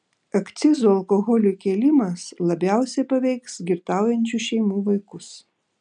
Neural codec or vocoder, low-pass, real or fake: none; 9.9 kHz; real